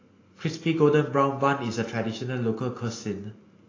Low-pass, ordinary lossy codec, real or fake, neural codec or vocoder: 7.2 kHz; AAC, 32 kbps; real; none